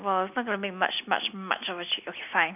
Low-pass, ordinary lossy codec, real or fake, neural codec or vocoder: 3.6 kHz; none; real; none